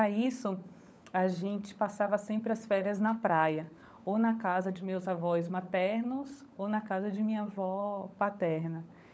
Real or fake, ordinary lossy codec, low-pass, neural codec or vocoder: fake; none; none; codec, 16 kHz, 16 kbps, FunCodec, trained on LibriTTS, 50 frames a second